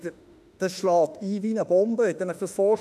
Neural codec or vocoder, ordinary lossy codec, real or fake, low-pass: autoencoder, 48 kHz, 32 numbers a frame, DAC-VAE, trained on Japanese speech; none; fake; 14.4 kHz